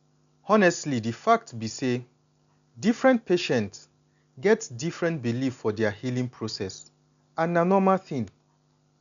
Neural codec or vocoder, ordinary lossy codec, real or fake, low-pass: none; none; real; 7.2 kHz